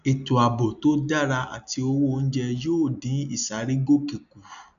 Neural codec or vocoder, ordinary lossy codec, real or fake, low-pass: none; none; real; 7.2 kHz